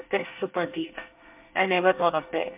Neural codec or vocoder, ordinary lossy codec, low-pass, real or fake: codec, 24 kHz, 1 kbps, SNAC; MP3, 32 kbps; 3.6 kHz; fake